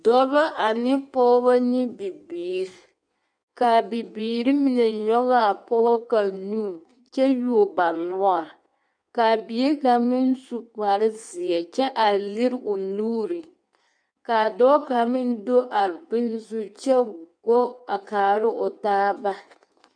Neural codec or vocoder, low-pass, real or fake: codec, 16 kHz in and 24 kHz out, 1.1 kbps, FireRedTTS-2 codec; 9.9 kHz; fake